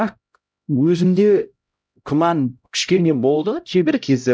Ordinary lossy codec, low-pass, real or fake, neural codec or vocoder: none; none; fake; codec, 16 kHz, 0.5 kbps, X-Codec, HuBERT features, trained on LibriSpeech